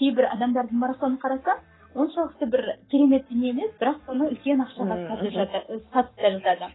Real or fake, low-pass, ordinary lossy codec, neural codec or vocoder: fake; 7.2 kHz; AAC, 16 kbps; codec, 44.1 kHz, 7.8 kbps, Pupu-Codec